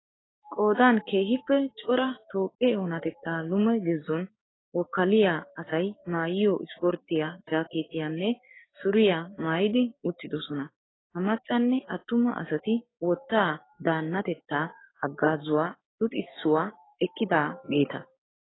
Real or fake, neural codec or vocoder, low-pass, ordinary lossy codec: fake; codec, 16 kHz, 6 kbps, DAC; 7.2 kHz; AAC, 16 kbps